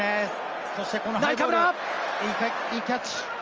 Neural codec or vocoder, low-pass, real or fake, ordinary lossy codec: none; 7.2 kHz; real; Opus, 24 kbps